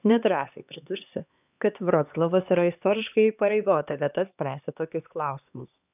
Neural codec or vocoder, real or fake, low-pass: codec, 16 kHz, 2 kbps, X-Codec, HuBERT features, trained on LibriSpeech; fake; 3.6 kHz